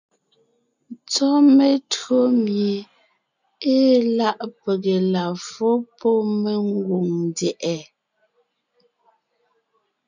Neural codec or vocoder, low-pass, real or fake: none; 7.2 kHz; real